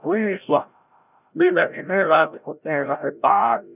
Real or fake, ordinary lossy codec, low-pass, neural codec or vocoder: fake; none; 3.6 kHz; codec, 16 kHz, 0.5 kbps, FreqCodec, larger model